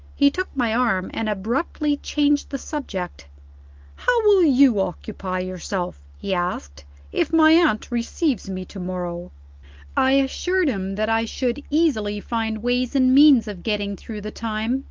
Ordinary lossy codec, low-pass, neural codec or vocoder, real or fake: Opus, 32 kbps; 7.2 kHz; none; real